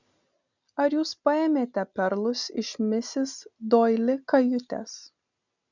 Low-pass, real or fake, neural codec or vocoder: 7.2 kHz; real; none